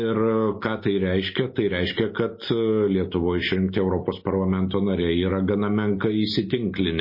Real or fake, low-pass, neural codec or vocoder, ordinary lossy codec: real; 5.4 kHz; none; MP3, 24 kbps